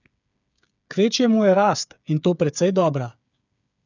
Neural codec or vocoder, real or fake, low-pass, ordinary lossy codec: codec, 16 kHz, 8 kbps, FreqCodec, smaller model; fake; 7.2 kHz; none